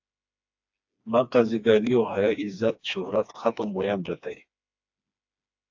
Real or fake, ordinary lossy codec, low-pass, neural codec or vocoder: fake; AAC, 48 kbps; 7.2 kHz; codec, 16 kHz, 2 kbps, FreqCodec, smaller model